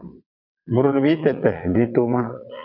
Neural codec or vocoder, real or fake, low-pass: vocoder, 22.05 kHz, 80 mel bands, WaveNeXt; fake; 5.4 kHz